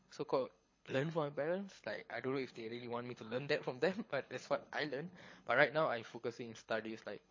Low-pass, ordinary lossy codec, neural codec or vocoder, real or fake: 7.2 kHz; MP3, 32 kbps; codec, 24 kHz, 6 kbps, HILCodec; fake